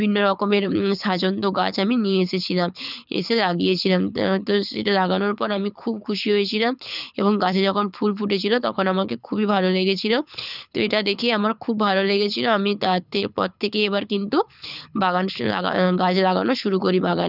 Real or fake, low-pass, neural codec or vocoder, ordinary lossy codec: fake; 5.4 kHz; codec, 24 kHz, 6 kbps, HILCodec; none